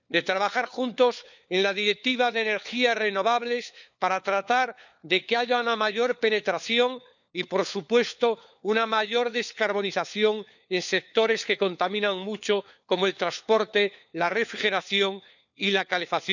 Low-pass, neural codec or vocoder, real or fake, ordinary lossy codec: 7.2 kHz; codec, 16 kHz, 4 kbps, FunCodec, trained on LibriTTS, 50 frames a second; fake; none